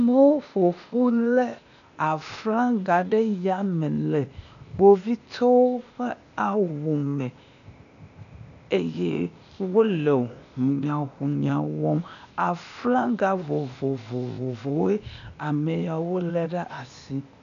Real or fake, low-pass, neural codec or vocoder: fake; 7.2 kHz; codec, 16 kHz, 0.8 kbps, ZipCodec